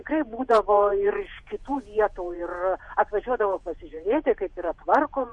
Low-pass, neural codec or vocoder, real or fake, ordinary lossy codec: 10.8 kHz; vocoder, 48 kHz, 128 mel bands, Vocos; fake; MP3, 48 kbps